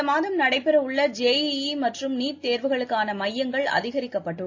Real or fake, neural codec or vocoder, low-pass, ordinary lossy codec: real; none; 7.2 kHz; AAC, 48 kbps